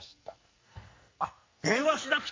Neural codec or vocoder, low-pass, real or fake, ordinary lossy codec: codec, 44.1 kHz, 2.6 kbps, SNAC; 7.2 kHz; fake; MP3, 48 kbps